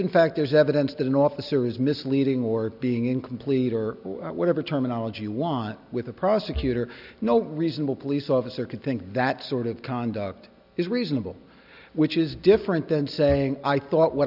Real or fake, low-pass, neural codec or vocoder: real; 5.4 kHz; none